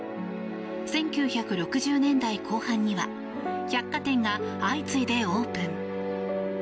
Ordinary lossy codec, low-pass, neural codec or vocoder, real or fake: none; none; none; real